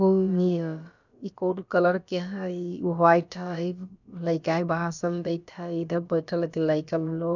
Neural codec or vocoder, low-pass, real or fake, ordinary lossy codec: codec, 16 kHz, about 1 kbps, DyCAST, with the encoder's durations; 7.2 kHz; fake; none